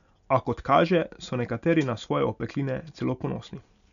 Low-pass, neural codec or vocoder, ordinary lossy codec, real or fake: 7.2 kHz; none; none; real